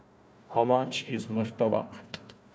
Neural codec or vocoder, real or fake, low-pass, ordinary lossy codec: codec, 16 kHz, 1 kbps, FunCodec, trained on Chinese and English, 50 frames a second; fake; none; none